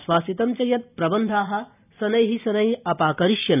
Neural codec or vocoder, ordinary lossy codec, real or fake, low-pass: none; none; real; 3.6 kHz